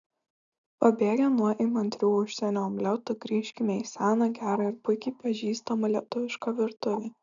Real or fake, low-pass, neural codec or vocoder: real; 7.2 kHz; none